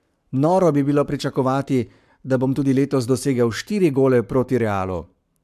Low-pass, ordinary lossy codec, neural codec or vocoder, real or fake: 14.4 kHz; MP3, 96 kbps; codec, 44.1 kHz, 7.8 kbps, Pupu-Codec; fake